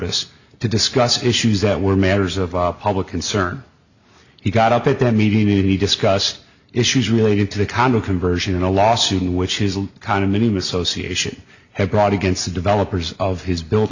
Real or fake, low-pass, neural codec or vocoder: real; 7.2 kHz; none